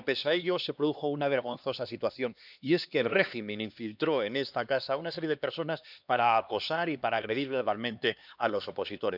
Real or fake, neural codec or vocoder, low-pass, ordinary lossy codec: fake; codec, 16 kHz, 2 kbps, X-Codec, HuBERT features, trained on LibriSpeech; 5.4 kHz; none